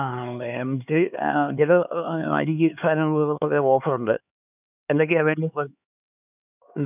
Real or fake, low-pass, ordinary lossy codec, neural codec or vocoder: fake; 3.6 kHz; none; codec, 16 kHz, 4 kbps, X-Codec, HuBERT features, trained on LibriSpeech